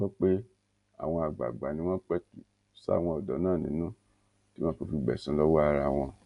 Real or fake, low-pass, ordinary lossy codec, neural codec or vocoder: real; 10.8 kHz; none; none